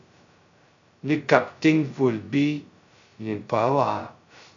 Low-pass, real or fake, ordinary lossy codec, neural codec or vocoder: 7.2 kHz; fake; MP3, 64 kbps; codec, 16 kHz, 0.2 kbps, FocalCodec